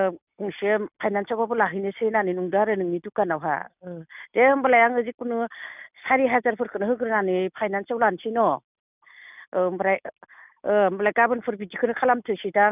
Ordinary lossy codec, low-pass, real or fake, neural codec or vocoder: none; 3.6 kHz; real; none